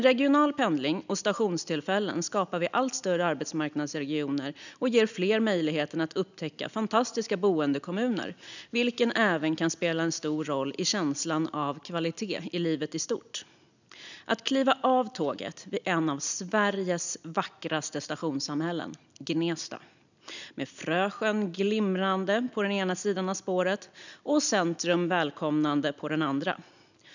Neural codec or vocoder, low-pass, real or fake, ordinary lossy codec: none; 7.2 kHz; real; none